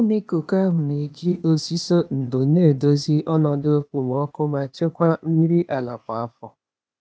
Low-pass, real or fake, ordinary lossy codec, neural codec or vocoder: none; fake; none; codec, 16 kHz, 0.8 kbps, ZipCodec